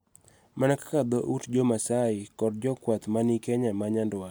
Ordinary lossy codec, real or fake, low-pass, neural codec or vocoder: none; real; none; none